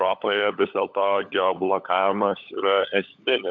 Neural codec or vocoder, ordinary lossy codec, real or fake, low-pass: codec, 16 kHz, 16 kbps, FunCodec, trained on LibriTTS, 50 frames a second; MP3, 64 kbps; fake; 7.2 kHz